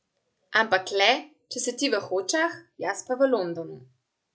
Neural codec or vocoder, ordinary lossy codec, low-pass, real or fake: none; none; none; real